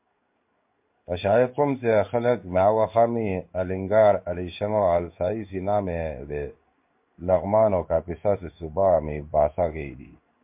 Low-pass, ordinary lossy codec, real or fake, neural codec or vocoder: 3.6 kHz; MP3, 32 kbps; fake; codec, 16 kHz in and 24 kHz out, 1 kbps, XY-Tokenizer